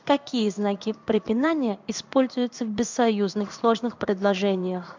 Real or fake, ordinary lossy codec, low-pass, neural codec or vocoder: fake; MP3, 64 kbps; 7.2 kHz; codec, 16 kHz in and 24 kHz out, 1 kbps, XY-Tokenizer